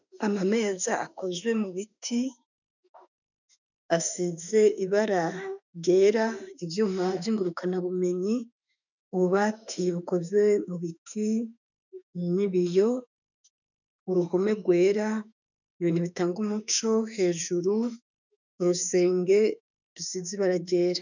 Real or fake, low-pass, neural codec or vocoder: fake; 7.2 kHz; autoencoder, 48 kHz, 32 numbers a frame, DAC-VAE, trained on Japanese speech